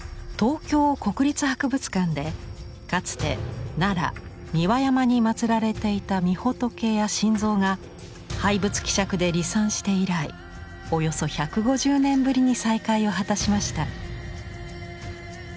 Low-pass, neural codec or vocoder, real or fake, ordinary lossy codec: none; none; real; none